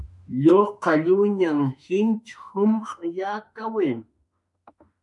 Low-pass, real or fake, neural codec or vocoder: 10.8 kHz; fake; autoencoder, 48 kHz, 32 numbers a frame, DAC-VAE, trained on Japanese speech